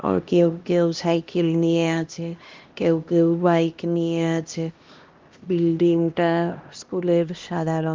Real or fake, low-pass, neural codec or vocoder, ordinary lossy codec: fake; 7.2 kHz; codec, 16 kHz, 1 kbps, X-Codec, HuBERT features, trained on LibriSpeech; Opus, 32 kbps